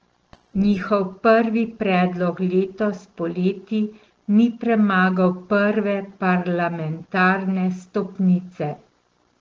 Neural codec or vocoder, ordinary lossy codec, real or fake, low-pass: none; Opus, 16 kbps; real; 7.2 kHz